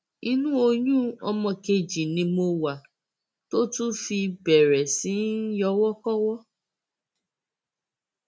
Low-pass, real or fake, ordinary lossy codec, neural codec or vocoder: none; real; none; none